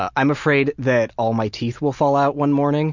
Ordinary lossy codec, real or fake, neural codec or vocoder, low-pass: Opus, 64 kbps; real; none; 7.2 kHz